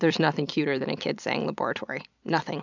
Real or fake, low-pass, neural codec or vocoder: fake; 7.2 kHz; vocoder, 44.1 kHz, 128 mel bands every 256 samples, BigVGAN v2